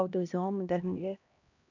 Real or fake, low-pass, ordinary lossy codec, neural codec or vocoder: fake; 7.2 kHz; none; codec, 16 kHz, 1 kbps, X-Codec, HuBERT features, trained on LibriSpeech